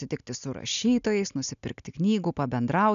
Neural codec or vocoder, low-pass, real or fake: none; 7.2 kHz; real